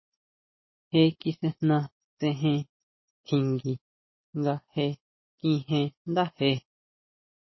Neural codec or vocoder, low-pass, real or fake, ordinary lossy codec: none; 7.2 kHz; real; MP3, 24 kbps